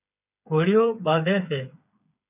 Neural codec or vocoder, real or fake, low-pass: codec, 16 kHz, 8 kbps, FreqCodec, smaller model; fake; 3.6 kHz